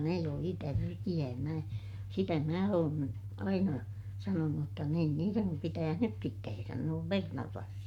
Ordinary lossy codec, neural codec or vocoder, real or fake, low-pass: none; codec, 44.1 kHz, 7.8 kbps, Pupu-Codec; fake; 19.8 kHz